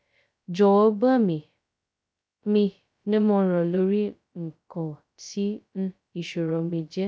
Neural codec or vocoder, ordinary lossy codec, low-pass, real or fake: codec, 16 kHz, 0.2 kbps, FocalCodec; none; none; fake